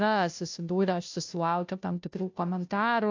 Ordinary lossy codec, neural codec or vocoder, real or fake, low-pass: AAC, 48 kbps; codec, 16 kHz, 0.5 kbps, FunCodec, trained on Chinese and English, 25 frames a second; fake; 7.2 kHz